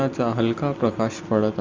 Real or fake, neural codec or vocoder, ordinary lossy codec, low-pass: real; none; Opus, 32 kbps; 7.2 kHz